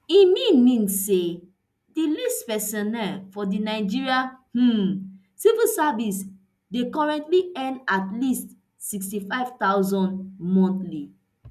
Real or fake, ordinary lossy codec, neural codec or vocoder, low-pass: real; none; none; 14.4 kHz